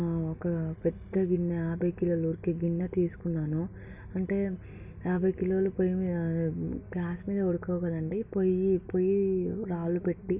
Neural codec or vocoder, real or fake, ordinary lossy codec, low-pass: none; real; none; 3.6 kHz